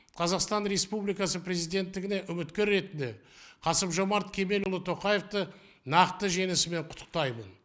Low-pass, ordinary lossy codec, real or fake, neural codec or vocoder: none; none; real; none